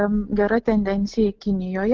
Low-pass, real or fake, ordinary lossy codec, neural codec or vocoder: 7.2 kHz; real; Opus, 16 kbps; none